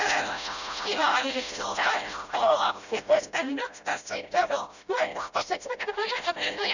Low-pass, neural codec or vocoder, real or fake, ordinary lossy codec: 7.2 kHz; codec, 16 kHz, 0.5 kbps, FreqCodec, smaller model; fake; none